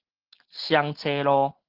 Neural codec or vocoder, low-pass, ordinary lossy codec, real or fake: none; 5.4 kHz; Opus, 16 kbps; real